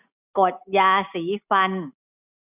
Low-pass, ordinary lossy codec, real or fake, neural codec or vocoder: 3.6 kHz; none; real; none